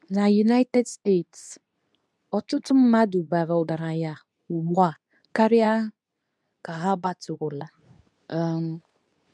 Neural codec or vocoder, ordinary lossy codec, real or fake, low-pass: codec, 24 kHz, 0.9 kbps, WavTokenizer, medium speech release version 2; none; fake; none